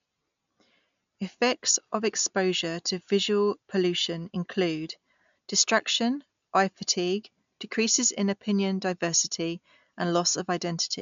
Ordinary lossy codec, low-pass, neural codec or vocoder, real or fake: MP3, 64 kbps; 7.2 kHz; none; real